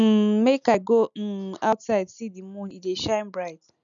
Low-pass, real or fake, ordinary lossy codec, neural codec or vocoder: 7.2 kHz; real; none; none